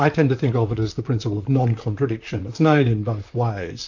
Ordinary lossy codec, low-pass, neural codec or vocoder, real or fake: AAC, 48 kbps; 7.2 kHz; vocoder, 44.1 kHz, 128 mel bands, Pupu-Vocoder; fake